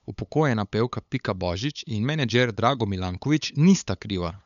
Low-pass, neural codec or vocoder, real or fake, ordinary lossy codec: 7.2 kHz; codec, 16 kHz, 8 kbps, FunCodec, trained on LibriTTS, 25 frames a second; fake; none